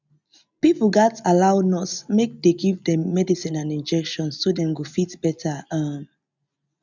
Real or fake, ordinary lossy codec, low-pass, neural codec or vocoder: real; none; 7.2 kHz; none